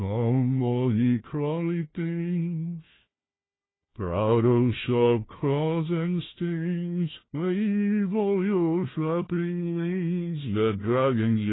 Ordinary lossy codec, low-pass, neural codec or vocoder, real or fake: AAC, 16 kbps; 7.2 kHz; codec, 16 kHz, 1 kbps, FunCodec, trained on Chinese and English, 50 frames a second; fake